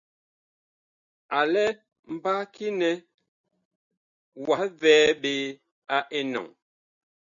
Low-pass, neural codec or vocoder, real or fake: 7.2 kHz; none; real